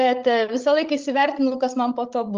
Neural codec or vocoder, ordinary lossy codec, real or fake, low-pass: codec, 16 kHz, 16 kbps, FreqCodec, larger model; Opus, 32 kbps; fake; 7.2 kHz